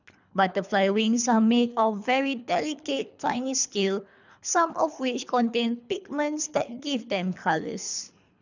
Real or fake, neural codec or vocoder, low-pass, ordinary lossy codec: fake; codec, 24 kHz, 3 kbps, HILCodec; 7.2 kHz; none